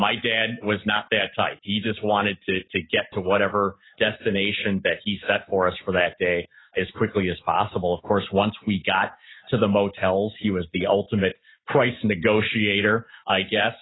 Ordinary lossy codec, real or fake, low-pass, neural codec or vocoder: AAC, 16 kbps; real; 7.2 kHz; none